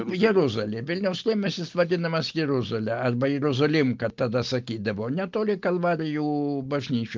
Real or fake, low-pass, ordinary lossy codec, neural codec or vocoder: real; 7.2 kHz; Opus, 24 kbps; none